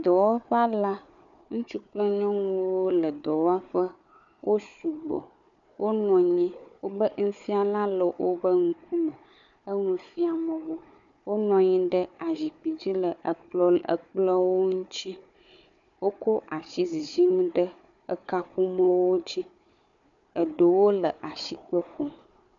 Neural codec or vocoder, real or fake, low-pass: codec, 16 kHz, 4 kbps, FunCodec, trained on Chinese and English, 50 frames a second; fake; 7.2 kHz